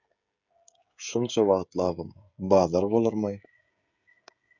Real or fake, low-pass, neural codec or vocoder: fake; 7.2 kHz; codec, 16 kHz, 16 kbps, FreqCodec, smaller model